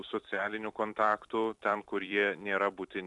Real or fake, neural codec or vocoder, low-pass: fake; vocoder, 44.1 kHz, 128 mel bands every 256 samples, BigVGAN v2; 10.8 kHz